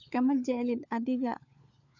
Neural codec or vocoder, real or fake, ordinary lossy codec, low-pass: codec, 16 kHz, 4 kbps, FunCodec, trained on LibriTTS, 50 frames a second; fake; none; 7.2 kHz